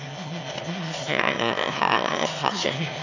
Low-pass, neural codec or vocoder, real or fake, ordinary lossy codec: 7.2 kHz; autoencoder, 22.05 kHz, a latent of 192 numbers a frame, VITS, trained on one speaker; fake; none